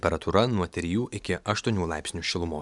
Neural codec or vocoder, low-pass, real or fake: none; 10.8 kHz; real